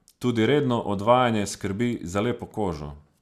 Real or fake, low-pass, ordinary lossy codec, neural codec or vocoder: real; 14.4 kHz; Opus, 64 kbps; none